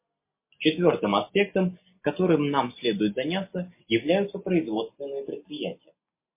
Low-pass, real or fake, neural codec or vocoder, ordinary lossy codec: 3.6 kHz; real; none; MP3, 24 kbps